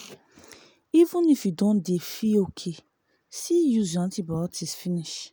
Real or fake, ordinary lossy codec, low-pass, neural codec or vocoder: real; none; none; none